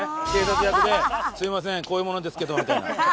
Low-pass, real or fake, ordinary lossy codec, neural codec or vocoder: none; real; none; none